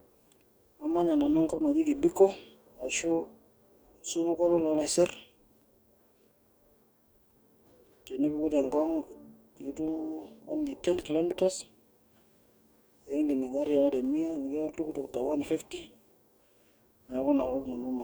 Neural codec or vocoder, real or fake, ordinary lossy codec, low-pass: codec, 44.1 kHz, 2.6 kbps, DAC; fake; none; none